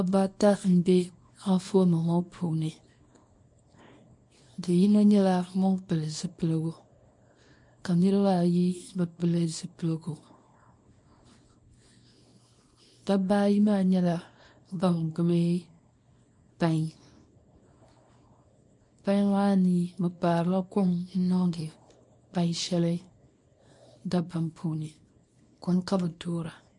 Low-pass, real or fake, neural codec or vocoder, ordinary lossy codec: 10.8 kHz; fake; codec, 24 kHz, 0.9 kbps, WavTokenizer, small release; MP3, 48 kbps